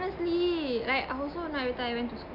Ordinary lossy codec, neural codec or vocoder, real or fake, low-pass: none; none; real; 5.4 kHz